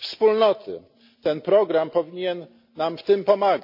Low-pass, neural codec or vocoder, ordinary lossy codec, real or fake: 5.4 kHz; none; MP3, 32 kbps; real